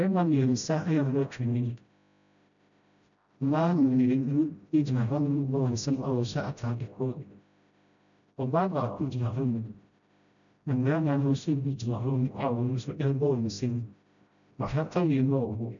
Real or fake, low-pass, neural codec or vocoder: fake; 7.2 kHz; codec, 16 kHz, 0.5 kbps, FreqCodec, smaller model